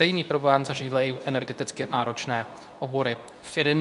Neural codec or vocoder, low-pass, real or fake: codec, 24 kHz, 0.9 kbps, WavTokenizer, medium speech release version 2; 10.8 kHz; fake